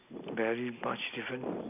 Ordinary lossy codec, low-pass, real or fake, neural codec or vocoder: none; 3.6 kHz; real; none